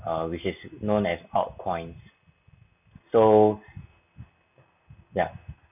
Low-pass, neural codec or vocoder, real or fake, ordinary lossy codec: 3.6 kHz; none; real; none